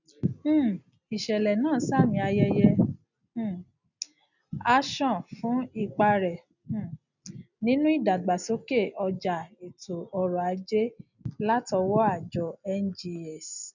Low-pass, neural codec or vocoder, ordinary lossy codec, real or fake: 7.2 kHz; none; none; real